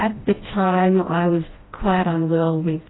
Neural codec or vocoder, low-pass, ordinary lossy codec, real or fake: codec, 16 kHz, 1 kbps, FreqCodec, smaller model; 7.2 kHz; AAC, 16 kbps; fake